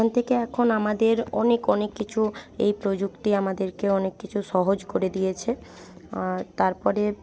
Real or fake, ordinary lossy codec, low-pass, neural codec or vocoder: real; none; none; none